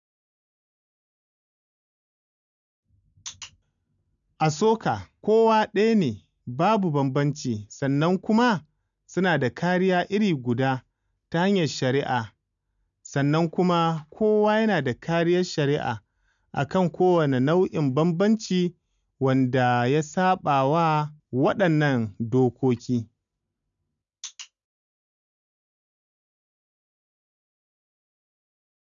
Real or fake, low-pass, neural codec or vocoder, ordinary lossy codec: real; 7.2 kHz; none; none